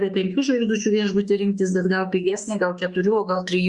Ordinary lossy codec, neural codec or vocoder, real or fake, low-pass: Opus, 64 kbps; autoencoder, 48 kHz, 32 numbers a frame, DAC-VAE, trained on Japanese speech; fake; 10.8 kHz